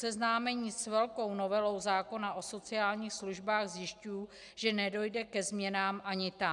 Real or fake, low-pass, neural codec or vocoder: real; 10.8 kHz; none